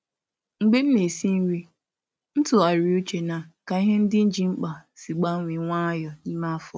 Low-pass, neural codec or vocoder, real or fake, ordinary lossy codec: none; none; real; none